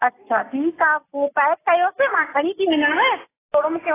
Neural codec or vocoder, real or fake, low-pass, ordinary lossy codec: none; real; 3.6 kHz; AAC, 16 kbps